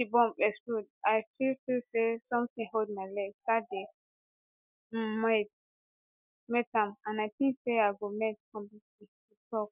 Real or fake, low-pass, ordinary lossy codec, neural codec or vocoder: real; 3.6 kHz; none; none